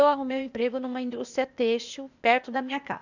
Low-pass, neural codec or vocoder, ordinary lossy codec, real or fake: 7.2 kHz; codec, 16 kHz, 0.8 kbps, ZipCodec; none; fake